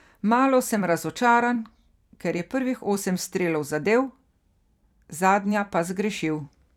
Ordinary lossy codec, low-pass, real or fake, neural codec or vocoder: none; 19.8 kHz; fake; vocoder, 44.1 kHz, 128 mel bands every 256 samples, BigVGAN v2